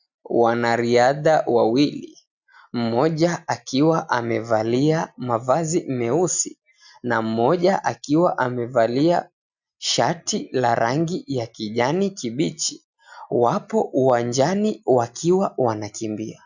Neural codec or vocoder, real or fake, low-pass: none; real; 7.2 kHz